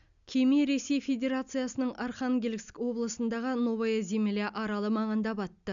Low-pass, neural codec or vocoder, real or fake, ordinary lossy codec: 7.2 kHz; none; real; none